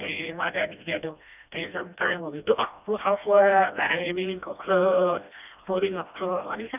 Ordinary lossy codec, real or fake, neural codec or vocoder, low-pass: none; fake; codec, 16 kHz, 1 kbps, FreqCodec, smaller model; 3.6 kHz